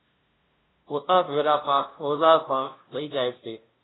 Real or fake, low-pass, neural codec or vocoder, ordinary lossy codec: fake; 7.2 kHz; codec, 16 kHz, 0.5 kbps, FunCodec, trained on LibriTTS, 25 frames a second; AAC, 16 kbps